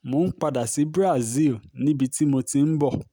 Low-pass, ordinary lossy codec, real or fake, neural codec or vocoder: none; none; real; none